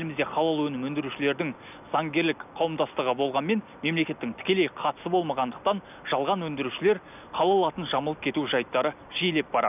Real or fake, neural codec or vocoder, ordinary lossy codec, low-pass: real; none; none; 3.6 kHz